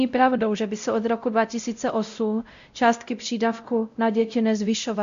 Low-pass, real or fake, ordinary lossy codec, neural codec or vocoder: 7.2 kHz; fake; MP3, 64 kbps; codec, 16 kHz, 0.5 kbps, X-Codec, WavLM features, trained on Multilingual LibriSpeech